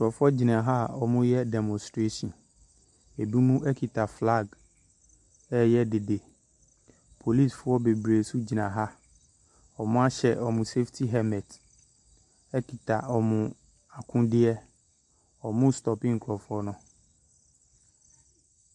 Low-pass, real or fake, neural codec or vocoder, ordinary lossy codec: 10.8 kHz; real; none; MP3, 64 kbps